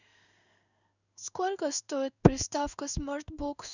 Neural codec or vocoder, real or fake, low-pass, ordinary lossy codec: codec, 16 kHz in and 24 kHz out, 1 kbps, XY-Tokenizer; fake; 7.2 kHz; none